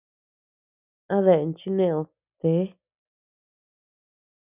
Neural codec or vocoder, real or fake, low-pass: none; real; 3.6 kHz